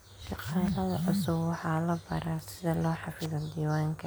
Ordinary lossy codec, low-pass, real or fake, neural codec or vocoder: none; none; real; none